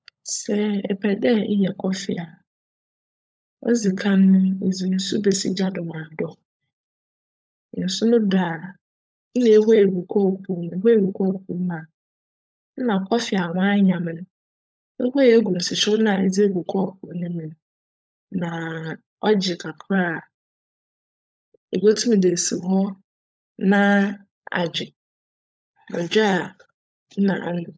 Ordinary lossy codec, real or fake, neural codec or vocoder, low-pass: none; fake; codec, 16 kHz, 16 kbps, FunCodec, trained on LibriTTS, 50 frames a second; none